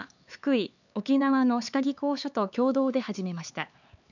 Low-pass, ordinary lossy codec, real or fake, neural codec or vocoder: 7.2 kHz; none; fake; codec, 16 kHz, 4 kbps, X-Codec, HuBERT features, trained on LibriSpeech